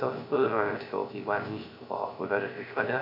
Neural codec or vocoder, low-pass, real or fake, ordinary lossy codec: codec, 16 kHz, 0.3 kbps, FocalCodec; 5.4 kHz; fake; none